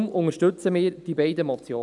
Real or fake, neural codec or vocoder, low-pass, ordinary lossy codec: real; none; 10.8 kHz; none